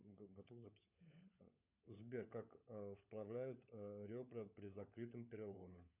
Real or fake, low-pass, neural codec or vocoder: fake; 3.6 kHz; codec, 16 kHz, 16 kbps, FunCodec, trained on LibriTTS, 50 frames a second